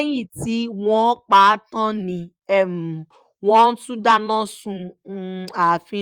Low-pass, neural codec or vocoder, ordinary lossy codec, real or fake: 19.8 kHz; vocoder, 44.1 kHz, 128 mel bands, Pupu-Vocoder; Opus, 32 kbps; fake